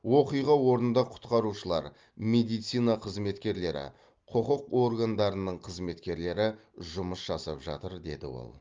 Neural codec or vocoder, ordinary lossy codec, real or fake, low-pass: none; Opus, 32 kbps; real; 7.2 kHz